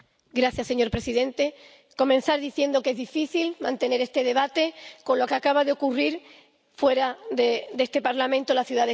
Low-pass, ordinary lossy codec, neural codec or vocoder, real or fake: none; none; none; real